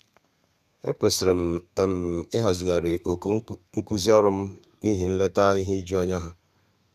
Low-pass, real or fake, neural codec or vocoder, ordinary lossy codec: 14.4 kHz; fake; codec, 32 kHz, 1.9 kbps, SNAC; none